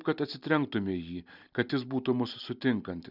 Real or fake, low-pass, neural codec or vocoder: real; 5.4 kHz; none